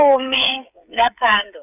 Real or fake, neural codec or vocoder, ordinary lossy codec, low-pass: fake; codec, 16 kHz, 8 kbps, FreqCodec, smaller model; none; 3.6 kHz